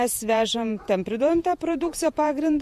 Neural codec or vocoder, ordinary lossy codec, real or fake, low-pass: vocoder, 48 kHz, 128 mel bands, Vocos; MP3, 64 kbps; fake; 14.4 kHz